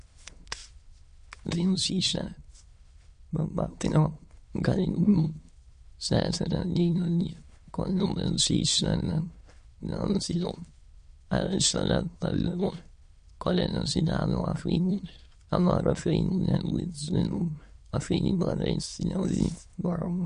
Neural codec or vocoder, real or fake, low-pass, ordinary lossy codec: autoencoder, 22.05 kHz, a latent of 192 numbers a frame, VITS, trained on many speakers; fake; 9.9 kHz; MP3, 48 kbps